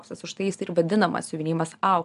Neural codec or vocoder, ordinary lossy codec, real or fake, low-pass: none; AAC, 96 kbps; real; 10.8 kHz